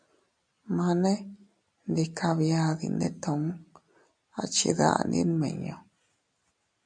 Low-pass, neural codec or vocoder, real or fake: 9.9 kHz; none; real